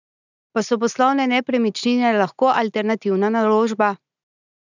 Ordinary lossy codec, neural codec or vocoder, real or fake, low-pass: none; none; real; 7.2 kHz